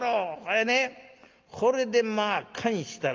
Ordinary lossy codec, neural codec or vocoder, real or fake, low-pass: Opus, 24 kbps; none; real; 7.2 kHz